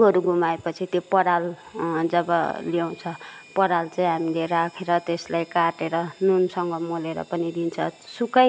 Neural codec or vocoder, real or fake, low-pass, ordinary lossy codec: none; real; none; none